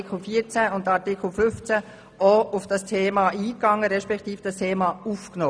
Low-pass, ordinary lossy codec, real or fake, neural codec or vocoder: none; none; real; none